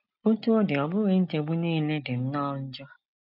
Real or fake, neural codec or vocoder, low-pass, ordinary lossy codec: real; none; 5.4 kHz; none